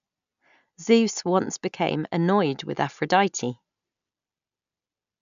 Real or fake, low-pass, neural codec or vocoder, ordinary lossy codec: real; 7.2 kHz; none; none